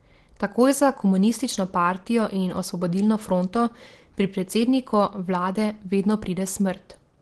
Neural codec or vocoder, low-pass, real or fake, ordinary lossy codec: none; 10.8 kHz; real; Opus, 16 kbps